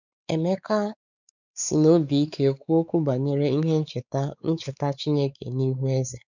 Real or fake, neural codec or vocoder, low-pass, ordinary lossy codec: fake; codec, 16 kHz, 4 kbps, X-Codec, WavLM features, trained on Multilingual LibriSpeech; 7.2 kHz; none